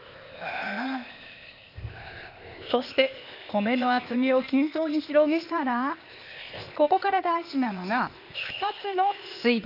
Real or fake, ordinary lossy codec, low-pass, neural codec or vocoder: fake; none; 5.4 kHz; codec, 16 kHz, 0.8 kbps, ZipCodec